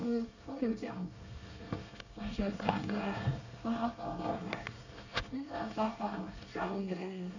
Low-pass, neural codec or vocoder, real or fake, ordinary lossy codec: 7.2 kHz; codec, 24 kHz, 1 kbps, SNAC; fake; none